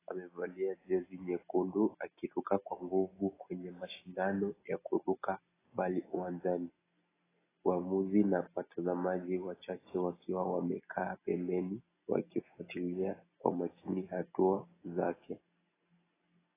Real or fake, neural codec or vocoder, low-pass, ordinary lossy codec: real; none; 3.6 kHz; AAC, 16 kbps